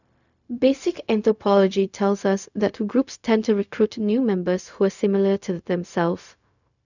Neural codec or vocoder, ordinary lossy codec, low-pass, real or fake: codec, 16 kHz, 0.4 kbps, LongCat-Audio-Codec; none; 7.2 kHz; fake